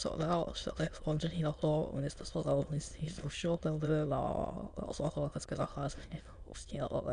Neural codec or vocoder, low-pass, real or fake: autoencoder, 22.05 kHz, a latent of 192 numbers a frame, VITS, trained on many speakers; 9.9 kHz; fake